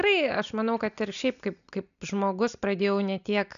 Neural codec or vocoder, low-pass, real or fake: none; 7.2 kHz; real